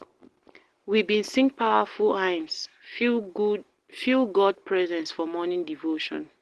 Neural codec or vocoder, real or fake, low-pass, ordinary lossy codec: none; real; 10.8 kHz; Opus, 16 kbps